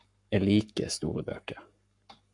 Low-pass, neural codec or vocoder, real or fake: 10.8 kHz; codec, 44.1 kHz, 7.8 kbps, Pupu-Codec; fake